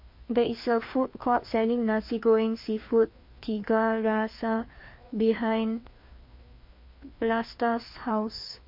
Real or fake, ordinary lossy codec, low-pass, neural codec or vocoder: fake; MP3, 32 kbps; 5.4 kHz; codec, 16 kHz, 2 kbps, FreqCodec, larger model